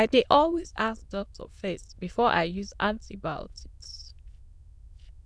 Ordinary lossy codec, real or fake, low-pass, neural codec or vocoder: none; fake; none; autoencoder, 22.05 kHz, a latent of 192 numbers a frame, VITS, trained on many speakers